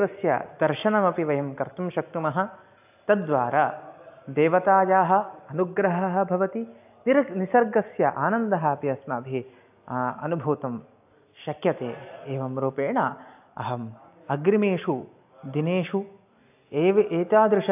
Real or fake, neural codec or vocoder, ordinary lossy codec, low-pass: real; none; AAC, 32 kbps; 3.6 kHz